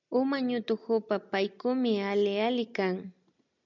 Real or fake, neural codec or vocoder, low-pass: real; none; 7.2 kHz